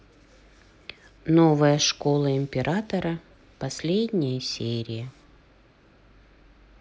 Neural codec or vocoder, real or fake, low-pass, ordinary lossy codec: none; real; none; none